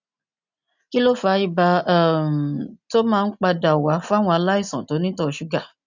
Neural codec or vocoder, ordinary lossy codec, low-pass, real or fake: none; none; 7.2 kHz; real